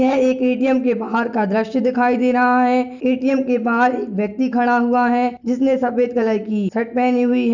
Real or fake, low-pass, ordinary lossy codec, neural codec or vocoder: real; 7.2 kHz; none; none